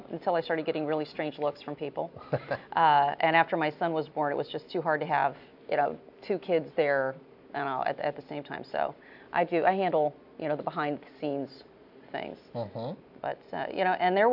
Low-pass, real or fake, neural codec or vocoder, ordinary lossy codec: 5.4 kHz; real; none; AAC, 48 kbps